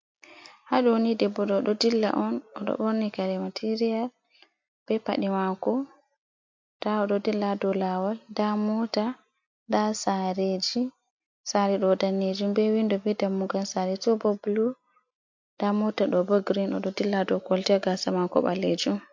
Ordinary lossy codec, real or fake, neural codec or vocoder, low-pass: MP3, 48 kbps; real; none; 7.2 kHz